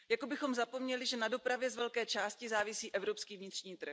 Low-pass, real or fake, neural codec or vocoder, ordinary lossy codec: none; real; none; none